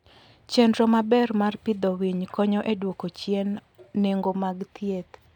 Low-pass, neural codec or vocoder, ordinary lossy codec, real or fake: 19.8 kHz; none; none; real